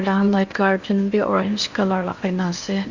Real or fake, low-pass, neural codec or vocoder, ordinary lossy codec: fake; 7.2 kHz; codec, 16 kHz in and 24 kHz out, 0.8 kbps, FocalCodec, streaming, 65536 codes; Opus, 64 kbps